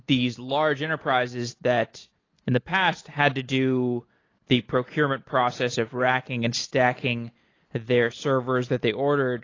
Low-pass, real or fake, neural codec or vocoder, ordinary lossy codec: 7.2 kHz; real; none; AAC, 32 kbps